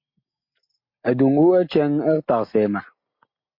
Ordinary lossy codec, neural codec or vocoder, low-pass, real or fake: MP3, 32 kbps; none; 5.4 kHz; real